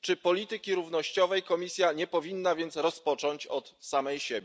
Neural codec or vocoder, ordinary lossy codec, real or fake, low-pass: none; none; real; none